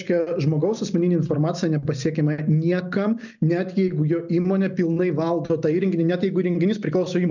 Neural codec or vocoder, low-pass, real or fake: none; 7.2 kHz; real